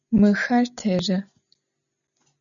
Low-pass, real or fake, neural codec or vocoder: 7.2 kHz; real; none